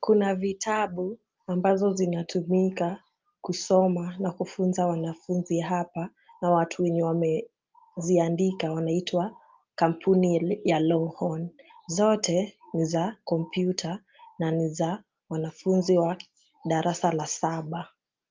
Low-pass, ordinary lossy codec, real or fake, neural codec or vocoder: 7.2 kHz; Opus, 24 kbps; real; none